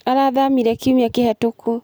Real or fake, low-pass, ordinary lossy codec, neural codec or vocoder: real; none; none; none